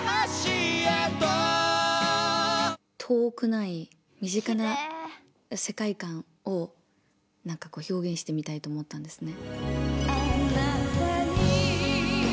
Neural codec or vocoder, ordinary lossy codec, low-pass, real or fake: none; none; none; real